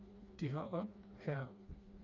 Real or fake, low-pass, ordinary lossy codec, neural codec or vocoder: fake; 7.2 kHz; Opus, 64 kbps; codec, 16 kHz, 2 kbps, FreqCodec, smaller model